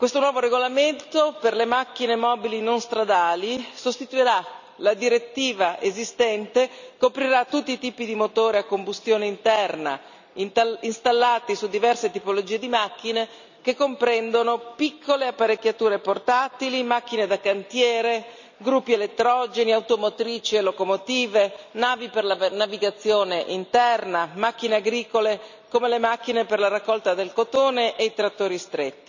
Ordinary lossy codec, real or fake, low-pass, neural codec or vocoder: none; real; 7.2 kHz; none